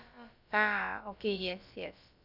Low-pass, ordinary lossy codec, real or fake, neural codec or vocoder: 5.4 kHz; none; fake; codec, 16 kHz, about 1 kbps, DyCAST, with the encoder's durations